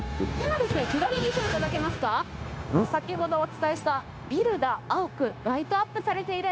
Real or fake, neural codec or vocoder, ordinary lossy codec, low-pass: fake; codec, 16 kHz, 0.9 kbps, LongCat-Audio-Codec; none; none